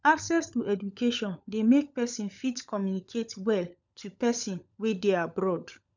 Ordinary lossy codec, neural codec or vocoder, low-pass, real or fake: none; codec, 16 kHz, 16 kbps, FunCodec, trained on LibriTTS, 50 frames a second; 7.2 kHz; fake